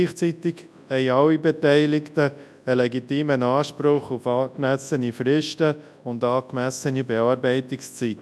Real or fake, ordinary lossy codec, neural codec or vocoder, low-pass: fake; none; codec, 24 kHz, 0.9 kbps, WavTokenizer, large speech release; none